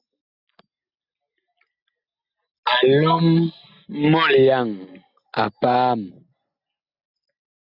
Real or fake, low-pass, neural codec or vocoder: real; 5.4 kHz; none